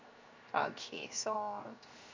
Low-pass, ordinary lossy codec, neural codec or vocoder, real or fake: 7.2 kHz; MP3, 48 kbps; codec, 16 kHz, 0.7 kbps, FocalCodec; fake